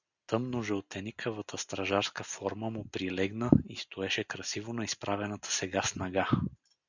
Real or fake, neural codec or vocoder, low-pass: real; none; 7.2 kHz